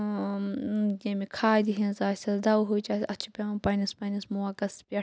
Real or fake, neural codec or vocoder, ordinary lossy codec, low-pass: real; none; none; none